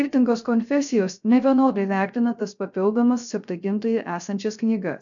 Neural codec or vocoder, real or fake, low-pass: codec, 16 kHz, 0.3 kbps, FocalCodec; fake; 7.2 kHz